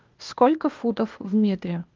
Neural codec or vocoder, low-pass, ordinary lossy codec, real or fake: codec, 16 kHz, 0.8 kbps, ZipCodec; 7.2 kHz; Opus, 32 kbps; fake